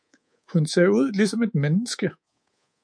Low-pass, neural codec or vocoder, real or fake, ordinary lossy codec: 9.9 kHz; codec, 24 kHz, 3.1 kbps, DualCodec; fake; MP3, 64 kbps